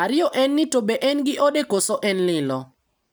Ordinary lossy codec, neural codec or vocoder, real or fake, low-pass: none; none; real; none